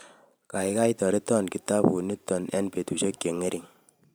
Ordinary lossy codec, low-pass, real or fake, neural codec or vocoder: none; none; fake; vocoder, 44.1 kHz, 128 mel bands every 512 samples, BigVGAN v2